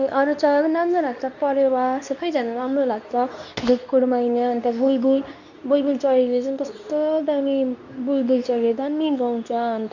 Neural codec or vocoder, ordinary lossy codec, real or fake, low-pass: codec, 24 kHz, 0.9 kbps, WavTokenizer, medium speech release version 2; none; fake; 7.2 kHz